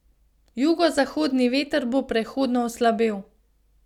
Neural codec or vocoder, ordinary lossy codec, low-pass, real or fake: none; none; 19.8 kHz; real